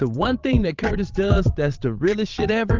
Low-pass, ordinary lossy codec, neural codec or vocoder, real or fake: 7.2 kHz; Opus, 24 kbps; vocoder, 22.05 kHz, 80 mel bands, WaveNeXt; fake